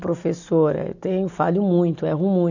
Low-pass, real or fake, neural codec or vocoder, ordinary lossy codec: 7.2 kHz; real; none; none